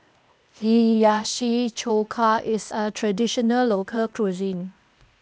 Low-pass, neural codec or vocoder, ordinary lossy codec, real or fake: none; codec, 16 kHz, 0.8 kbps, ZipCodec; none; fake